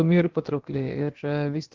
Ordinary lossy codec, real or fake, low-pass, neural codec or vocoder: Opus, 16 kbps; fake; 7.2 kHz; codec, 16 kHz, about 1 kbps, DyCAST, with the encoder's durations